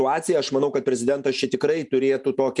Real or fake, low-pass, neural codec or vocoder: real; 10.8 kHz; none